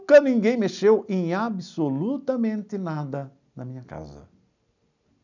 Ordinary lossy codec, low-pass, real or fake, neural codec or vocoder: none; 7.2 kHz; real; none